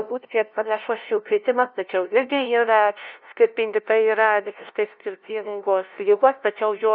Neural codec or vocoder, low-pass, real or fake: codec, 16 kHz, 0.5 kbps, FunCodec, trained on LibriTTS, 25 frames a second; 5.4 kHz; fake